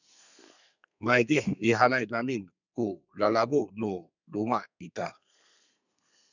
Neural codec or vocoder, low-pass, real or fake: codec, 32 kHz, 1.9 kbps, SNAC; 7.2 kHz; fake